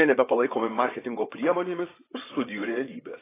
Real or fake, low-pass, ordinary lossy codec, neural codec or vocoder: fake; 3.6 kHz; AAC, 16 kbps; vocoder, 22.05 kHz, 80 mel bands, WaveNeXt